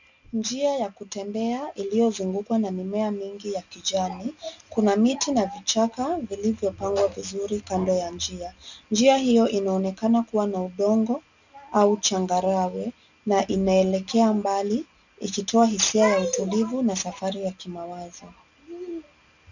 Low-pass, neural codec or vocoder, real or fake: 7.2 kHz; none; real